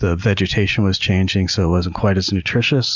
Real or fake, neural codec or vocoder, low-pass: real; none; 7.2 kHz